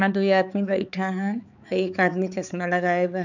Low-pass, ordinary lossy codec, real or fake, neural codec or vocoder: 7.2 kHz; none; fake; codec, 16 kHz, 4 kbps, X-Codec, HuBERT features, trained on balanced general audio